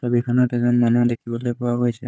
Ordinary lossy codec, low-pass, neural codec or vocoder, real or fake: none; none; codec, 16 kHz, 4 kbps, FunCodec, trained on Chinese and English, 50 frames a second; fake